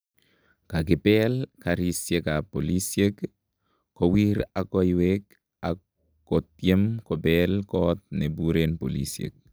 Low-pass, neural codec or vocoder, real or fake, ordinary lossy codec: none; none; real; none